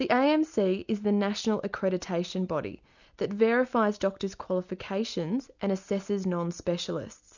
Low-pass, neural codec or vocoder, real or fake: 7.2 kHz; none; real